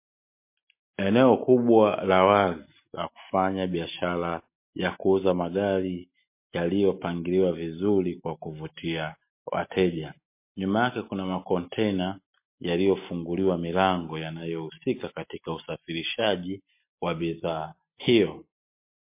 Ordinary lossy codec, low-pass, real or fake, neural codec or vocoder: MP3, 24 kbps; 3.6 kHz; real; none